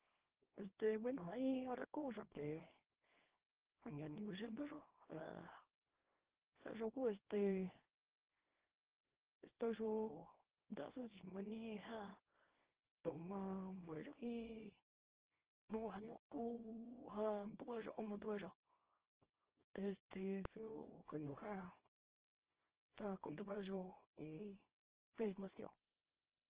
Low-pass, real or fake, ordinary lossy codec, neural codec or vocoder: 3.6 kHz; fake; Opus, 16 kbps; codec, 24 kHz, 0.9 kbps, WavTokenizer, small release